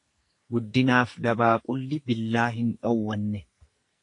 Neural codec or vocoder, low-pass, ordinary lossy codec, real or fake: codec, 44.1 kHz, 2.6 kbps, SNAC; 10.8 kHz; AAC, 48 kbps; fake